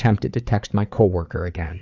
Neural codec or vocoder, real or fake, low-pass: codec, 16 kHz, 4 kbps, FreqCodec, larger model; fake; 7.2 kHz